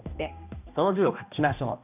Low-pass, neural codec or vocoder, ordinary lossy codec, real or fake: 3.6 kHz; codec, 16 kHz, 1 kbps, X-Codec, HuBERT features, trained on balanced general audio; none; fake